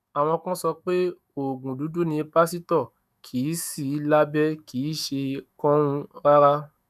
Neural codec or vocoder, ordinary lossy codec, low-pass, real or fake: autoencoder, 48 kHz, 128 numbers a frame, DAC-VAE, trained on Japanese speech; none; 14.4 kHz; fake